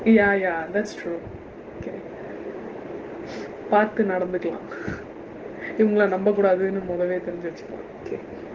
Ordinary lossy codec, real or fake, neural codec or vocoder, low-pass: Opus, 16 kbps; real; none; 7.2 kHz